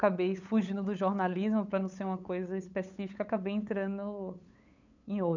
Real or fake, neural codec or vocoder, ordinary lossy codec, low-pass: fake; codec, 16 kHz, 8 kbps, FunCodec, trained on LibriTTS, 25 frames a second; none; 7.2 kHz